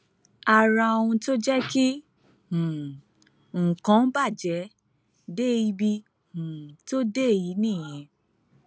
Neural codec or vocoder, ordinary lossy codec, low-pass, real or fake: none; none; none; real